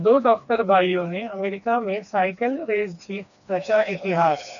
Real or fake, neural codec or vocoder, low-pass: fake; codec, 16 kHz, 2 kbps, FreqCodec, smaller model; 7.2 kHz